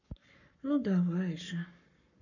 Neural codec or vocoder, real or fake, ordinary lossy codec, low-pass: codec, 44.1 kHz, 7.8 kbps, Pupu-Codec; fake; none; 7.2 kHz